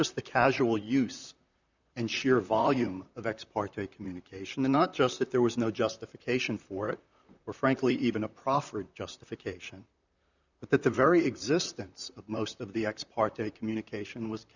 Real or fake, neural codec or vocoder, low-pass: fake; vocoder, 44.1 kHz, 128 mel bands, Pupu-Vocoder; 7.2 kHz